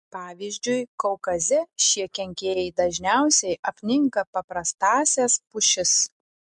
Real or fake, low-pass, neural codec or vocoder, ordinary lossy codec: real; 10.8 kHz; none; MP3, 64 kbps